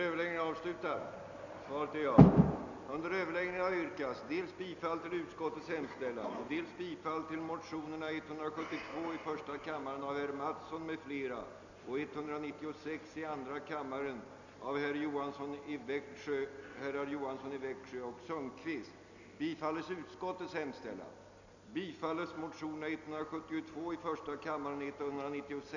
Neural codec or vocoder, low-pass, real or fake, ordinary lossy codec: none; 7.2 kHz; real; none